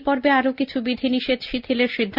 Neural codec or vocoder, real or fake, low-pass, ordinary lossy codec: none; real; 5.4 kHz; Opus, 24 kbps